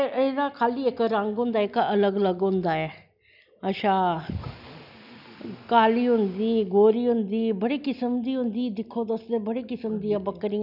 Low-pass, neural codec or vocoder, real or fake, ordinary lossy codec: 5.4 kHz; none; real; none